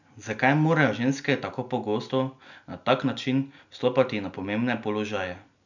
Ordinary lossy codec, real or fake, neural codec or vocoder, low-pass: none; real; none; 7.2 kHz